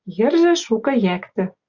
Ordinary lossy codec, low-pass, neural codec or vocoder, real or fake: AAC, 48 kbps; 7.2 kHz; none; real